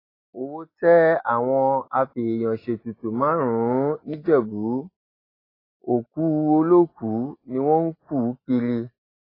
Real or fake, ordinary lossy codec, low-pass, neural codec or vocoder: real; AAC, 24 kbps; 5.4 kHz; none